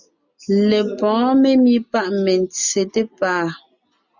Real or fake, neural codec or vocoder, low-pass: real; none; 7.2 kHz